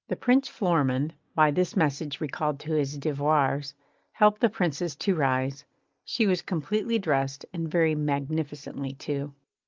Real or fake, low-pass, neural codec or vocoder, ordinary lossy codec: real; 7.2 kHz; none; Opus, 24 kbps